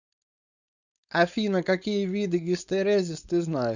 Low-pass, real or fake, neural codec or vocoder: 7.2 kHz; fake; codec, 16 kHz, 4.8 kbps, FACodec